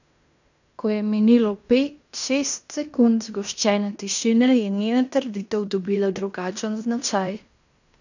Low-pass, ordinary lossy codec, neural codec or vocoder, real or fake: 7.2 kHz; none; codec, 16 kHz in and 24 kHz out, 0.9 kbps, LongCat-Audio-Codec, fine tuned four codebook decoder; fake